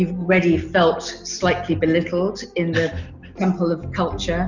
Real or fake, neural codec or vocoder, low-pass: real; none; 7.2 kHz